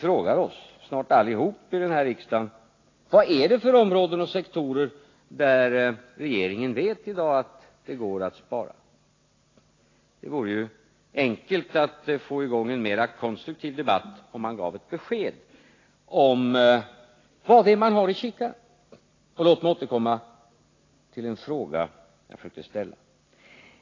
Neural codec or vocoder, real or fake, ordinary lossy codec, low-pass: none; real; AAC, 32 kbps; 7.2 kHz